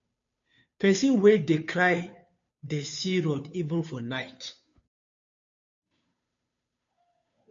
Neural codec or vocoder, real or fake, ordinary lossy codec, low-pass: codec, 16 kHz, 2 kbps, FunCodec, trained on Chinese and English, 25 frames a second; fake; MP3, 48 kbps; 7.2 kHz